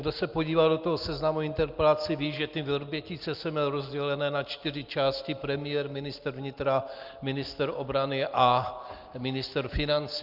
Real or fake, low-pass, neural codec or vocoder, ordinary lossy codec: real; 5.4 kHz; none; Opus, 24 kbps